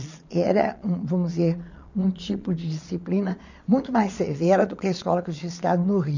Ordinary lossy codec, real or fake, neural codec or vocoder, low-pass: none; fake; vocoder, 22.05 kHz, 80 mel bands, Vocos; 7.2 kHz